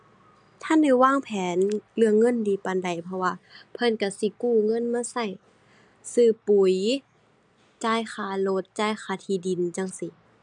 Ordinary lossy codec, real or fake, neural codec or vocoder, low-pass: none; real; none; 9.9 kHz